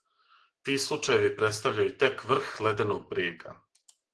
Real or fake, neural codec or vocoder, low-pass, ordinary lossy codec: fake; autoencoder, 48 kHz, 128 numbers a frame, DAC-VAE, trained on Japanese speech; 10.8 kHz; Opus, 16 kbps